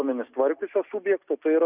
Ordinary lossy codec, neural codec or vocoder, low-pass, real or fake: Opus, 64 kbps; none; 3.6 kHz; real